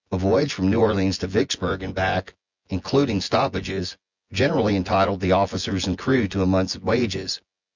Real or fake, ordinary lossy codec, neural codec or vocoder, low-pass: fake; Opus, 64 kbps; vocoder, 24 kHz, 100 mel bands, Vocos; 7.2 kHz